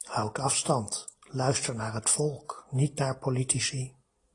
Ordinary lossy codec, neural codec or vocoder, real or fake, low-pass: AAC, 32 kbps; none; real; 10.8 kHz